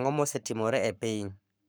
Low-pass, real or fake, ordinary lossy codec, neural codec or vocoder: none; fake; none; codec, 44.1 kHz, 7.8 kbps, Pupu-Codec